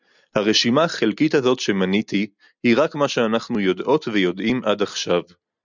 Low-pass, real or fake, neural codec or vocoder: 7.2 kHz; real; none